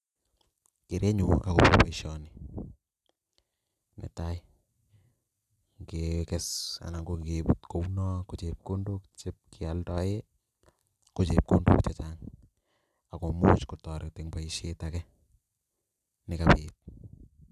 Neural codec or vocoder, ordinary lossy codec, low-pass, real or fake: none; none; 14.4 kHz; real